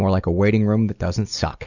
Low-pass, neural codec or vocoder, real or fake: 7.2 kHz; none; real